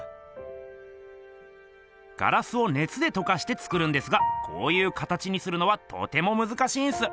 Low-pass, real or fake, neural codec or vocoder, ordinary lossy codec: none; real; none; none